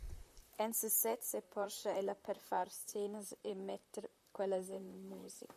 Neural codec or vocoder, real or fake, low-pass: vocoder, 44.1 kHz, 128 mel bands, Pupu-Vocoder; fake; 14.4 kHz